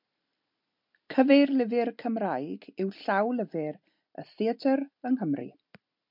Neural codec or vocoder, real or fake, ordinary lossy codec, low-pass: none; real; MP3, 48 kbps; 5.4 kHz